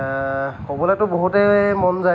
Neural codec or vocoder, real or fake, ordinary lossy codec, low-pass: none; real; none; none